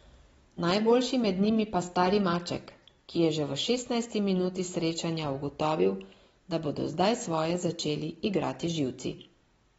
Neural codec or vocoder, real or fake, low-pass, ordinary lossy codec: none; real; 19.8 kHz; AAC, 24 kbps